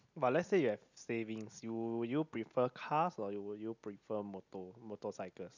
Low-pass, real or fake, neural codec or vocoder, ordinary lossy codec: 7.2 kHz; real; none; none